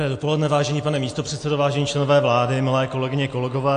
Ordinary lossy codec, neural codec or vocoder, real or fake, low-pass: AAC, 48 kbps; none; real; 9.9 kHz